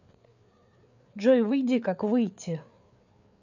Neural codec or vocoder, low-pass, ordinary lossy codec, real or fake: codec, 16 kHz, 4 kbps, FreqCodec, larger model; 7.2 kHz; none; fake